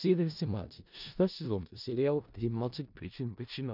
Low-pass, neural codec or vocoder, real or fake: 5.4 kHz; codec, 16 kHz in and 24 kHz out, 0.4 kbps, LongCat-Audio-Codec, four codebook decoder; fake